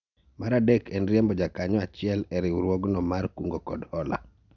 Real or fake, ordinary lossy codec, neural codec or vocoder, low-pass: real; none; none; 7.2 kHz